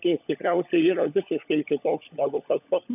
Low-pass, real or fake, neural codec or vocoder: 3.6 kHz; fake; codec, 16 kHz, 16 kbps, FunCodec, trained on LibriTTS, 50 frames a second